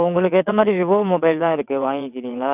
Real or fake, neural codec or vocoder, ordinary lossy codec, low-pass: fake; vocoder, 22.05 kHz, 80 mel bands, WaveNeXt; none; 3.6 kHz